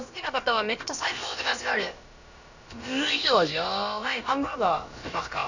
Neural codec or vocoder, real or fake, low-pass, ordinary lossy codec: codec, 16 kHz, about 1 kbps, DyCAST, with the encoder's durations; fake; 7.2 kHz; none